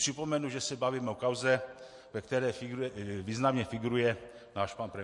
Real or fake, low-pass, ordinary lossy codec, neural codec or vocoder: real; 10.8 kHz; MP3, 48 kbps; none